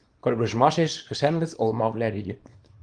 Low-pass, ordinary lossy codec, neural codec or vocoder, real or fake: 9.9 kHz; Opus, 24 kbps; codec, 24 kHz, 0.9 kbps, WavTokenizer, small release; fake